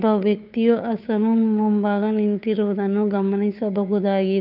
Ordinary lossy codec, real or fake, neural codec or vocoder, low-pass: none; fake; codec, 16 kHz, 2 kbps, FunCodec, trained on Chinese and English, 25 frames a second; 5.4 kHz